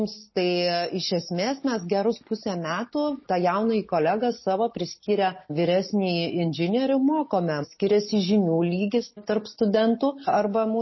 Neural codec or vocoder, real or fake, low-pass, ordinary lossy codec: none; real; 7.2 kHz; MP3, 24 kbps